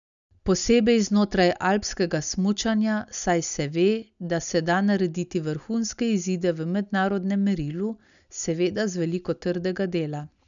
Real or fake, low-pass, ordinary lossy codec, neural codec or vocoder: real; 7.2 kHz; none; none